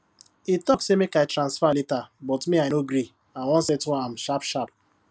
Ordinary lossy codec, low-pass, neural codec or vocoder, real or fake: none; none; none; real